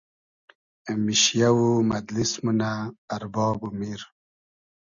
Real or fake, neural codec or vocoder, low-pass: real; none; 7.2 kHz